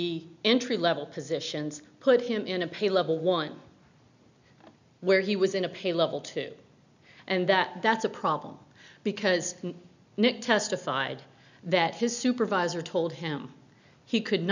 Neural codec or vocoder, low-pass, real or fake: none; 7.2 kHz; real